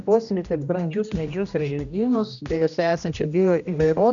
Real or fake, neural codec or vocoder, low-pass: fake; codec, 16 kHz, 1 kbps, X-Codec, HuBERT features, trained on general audio; 7.2 kHz